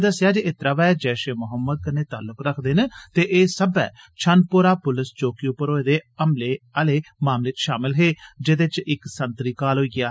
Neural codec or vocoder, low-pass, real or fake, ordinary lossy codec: none; none; real; none